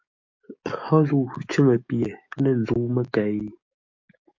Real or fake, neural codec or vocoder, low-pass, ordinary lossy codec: fake; codec, 44.1 kHz, 7.8 kbps, DAC; 7.2 kHz; MP3, 48 kbps